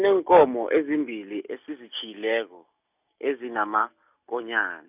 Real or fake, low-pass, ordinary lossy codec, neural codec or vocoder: real; 3.6 kHz; none; none